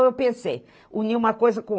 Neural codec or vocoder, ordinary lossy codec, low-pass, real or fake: none; none; none; real